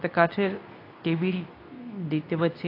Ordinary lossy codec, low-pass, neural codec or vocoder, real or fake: AAC, 32 kbps; 5.4 kHz; codec, 24 kHz, 0.9 kbps, WavTokenizer, medium speech release version 2; fake